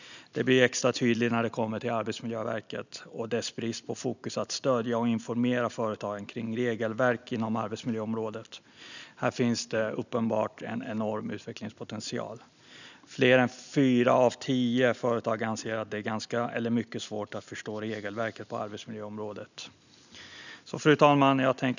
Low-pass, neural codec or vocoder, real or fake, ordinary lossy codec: 7.2 kHz; none; real; none